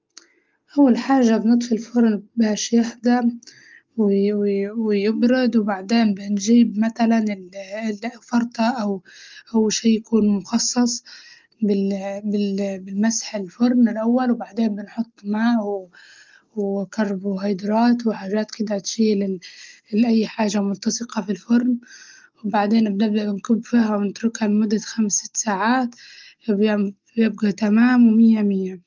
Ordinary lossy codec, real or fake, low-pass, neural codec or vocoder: Opus, 32 kbps; real; 7.2 kHz; none